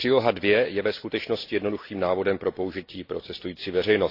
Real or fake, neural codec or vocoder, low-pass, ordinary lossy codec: real; none; 5.4 kHz; AAC, 32 kbps